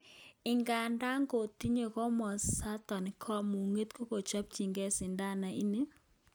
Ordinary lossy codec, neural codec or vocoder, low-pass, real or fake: none; none; none; real